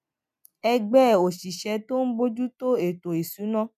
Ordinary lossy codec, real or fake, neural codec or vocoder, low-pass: none; real; none; 14.4 kHz